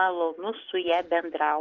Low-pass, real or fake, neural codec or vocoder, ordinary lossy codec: 7.2 kHz; real; none; Opus, 32 kbps